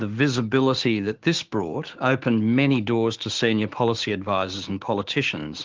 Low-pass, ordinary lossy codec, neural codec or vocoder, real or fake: 7.2 kHz; Opus, 16 kbps; codec, 16 kHz, 6 kbps, DAC; fake